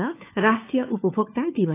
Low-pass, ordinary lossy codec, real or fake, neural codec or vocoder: 3.6 kHz; AAC, 16 kbps; fake; codec, 16 kHz, 4 kbps, FunCodec, trained on LibriTTS, 50 frames a second